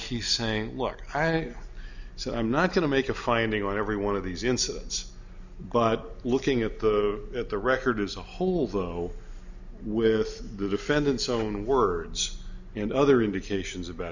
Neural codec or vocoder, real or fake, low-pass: none; real; 7.2 kHz